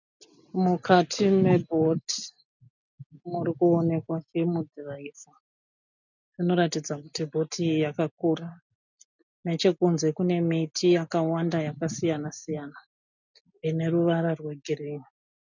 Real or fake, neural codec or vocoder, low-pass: real; none; 7.2 kHz